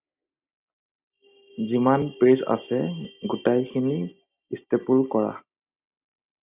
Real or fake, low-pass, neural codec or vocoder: real; 3.6 kHz; none